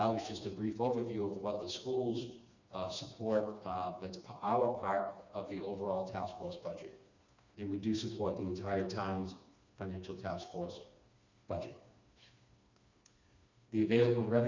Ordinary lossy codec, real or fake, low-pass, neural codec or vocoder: Opus, 64 kbps; fake; 7.2 kHz; codec, 16 kHz, 2 kbps, FreqCodec, smaller model